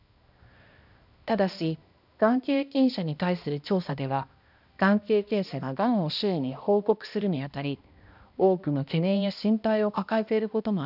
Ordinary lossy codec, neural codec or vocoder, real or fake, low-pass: none; codec, 16 kHz, 1 kbps, X-Codec, HuBERT features, trained on balanced general audio; fake; 5.4 kHz